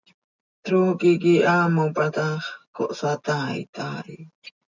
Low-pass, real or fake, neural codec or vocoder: 7.2 kHz; real; none